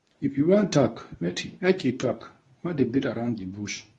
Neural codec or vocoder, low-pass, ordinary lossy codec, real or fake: codec, 24 kHz, 0.9 kbps, WavTokenizer, medium speech release version 2; 10.8 kHz; AAC, 32 kbps; fake